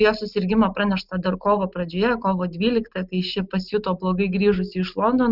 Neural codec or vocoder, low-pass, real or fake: none; 5.4 kHz; real